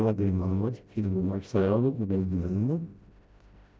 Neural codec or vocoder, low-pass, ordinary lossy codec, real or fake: codec, 16 kHz, 0.5 kbps, FreqCodec, smaller model; none; none; fake